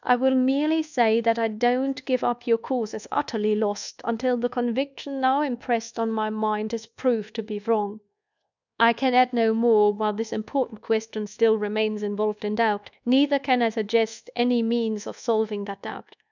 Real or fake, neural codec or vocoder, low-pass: fake; codec, 24 kHz, 1.2 kbps, DualCodec; 7.2 kHz